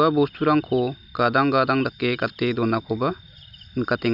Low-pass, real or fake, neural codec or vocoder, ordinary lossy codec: 5.4 kHz; real; none; none